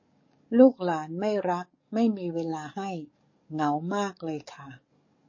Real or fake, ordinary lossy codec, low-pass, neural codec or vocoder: fake; MP3, 32 kbps; 7.2 kHz; codec, 44.1 kHz, 7.8 kbps, DAC